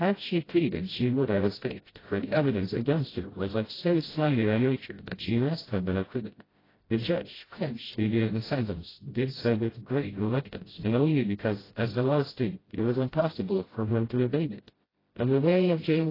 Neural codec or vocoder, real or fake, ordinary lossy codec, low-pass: codec, 16 kHz, 0.5 kbps, FreqCodec, smaller model; fake; AAC, 24 kbps; 5.4 kHz